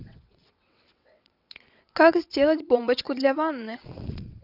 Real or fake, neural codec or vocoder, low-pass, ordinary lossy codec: fake; vocoder, 22.05 kHz, 80 mel bands, WaveNeXt; 5.4 kHz; none